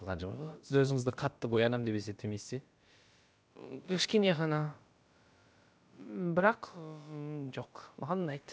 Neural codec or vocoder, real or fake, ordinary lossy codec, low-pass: codec, 16 kHz, about 1 kbps, DyCAST, with the encoder's durations; fake; none; none